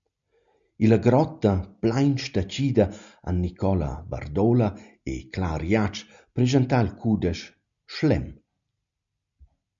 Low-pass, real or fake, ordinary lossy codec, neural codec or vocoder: 7.2 kHz; real; MP3, 64 kbps; none